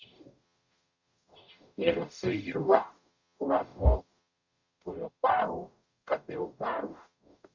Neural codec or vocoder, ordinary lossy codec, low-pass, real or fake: codec, 44.1 kHz, 0.9 kbps, DAC; Opus, 64 kbps; 7.2 kHz; fake